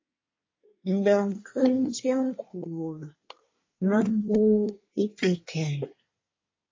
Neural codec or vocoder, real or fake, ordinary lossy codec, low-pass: codec, 24 kHz, 1 kbps, SNAC; fake; MP3, 32 kbps; 7.2 kHz